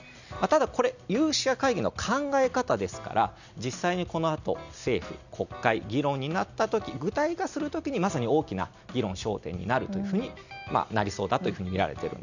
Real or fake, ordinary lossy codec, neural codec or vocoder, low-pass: real; none; none; 7.2 kHz